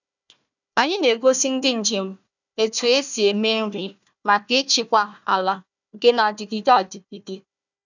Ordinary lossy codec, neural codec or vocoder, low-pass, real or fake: none; codec, 16 kHz, 1 kbps, FunCodec, trained on Chinese and English, 50 frames a second; 7.2 kHz; fake